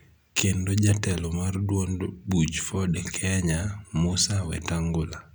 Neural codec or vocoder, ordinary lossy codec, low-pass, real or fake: none; none; none; real